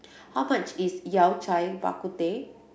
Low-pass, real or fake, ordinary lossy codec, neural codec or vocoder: none; real; none; none